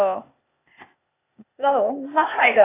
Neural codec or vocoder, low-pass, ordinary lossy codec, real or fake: codec, 16 kHz, 0.8 kbps, ZipCodec; 3.6 kHz; AAC, 24 kbps; fake